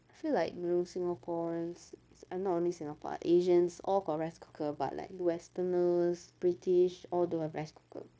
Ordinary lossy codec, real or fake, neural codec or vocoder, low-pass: none; fake; codec, 16 kHz, 0.9 kbps, LongCat-Audio-Codec; none